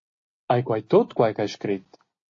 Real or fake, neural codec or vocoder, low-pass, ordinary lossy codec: real; none; 7.2 kHz; AAC, 48 kbps